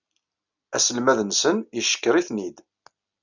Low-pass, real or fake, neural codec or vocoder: 7.2 kHz; real; none